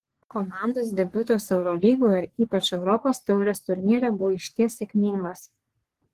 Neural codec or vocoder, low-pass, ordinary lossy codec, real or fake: codec, 44.1 kHz, 3.4 kbps, Pupu-Codec; 14.4 kHz; Opus, 16 kbps; fake